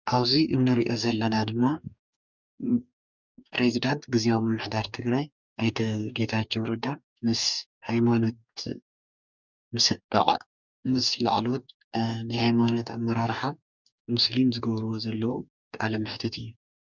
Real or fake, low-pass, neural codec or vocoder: fake; 7.2 kHz; codec, 44.1 kHz, 2.6 kbps, DAC